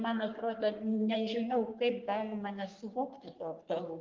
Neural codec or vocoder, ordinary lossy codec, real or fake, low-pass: codec, 44.1 kHz, 3.4 kbps, Pupu-Codec; Opus, 32 kbps; fake; 7.2 kHz